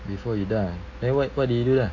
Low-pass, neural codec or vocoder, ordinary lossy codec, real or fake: 7.2 kHz; none; AAC, 32 kbps; real